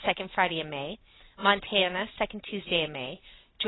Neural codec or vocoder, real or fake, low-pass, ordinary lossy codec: none; real; 7.2 kHz; AAC, 16 kbps